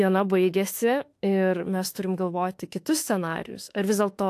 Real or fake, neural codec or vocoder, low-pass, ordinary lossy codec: fake; autoencoder, 48 kHz, 32 numbers a frame, DAC-VAE, trained on Japanese speech; 14.4 kHz; AAC, 64 kbps